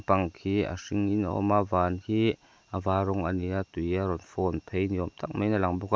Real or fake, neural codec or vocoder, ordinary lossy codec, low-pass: real; none; none; none